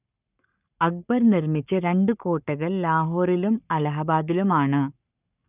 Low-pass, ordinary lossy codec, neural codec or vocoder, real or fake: 3.6 kHz; none; codec, 44.1 kHz, 7.8 kbps, Pupu-Codec; fake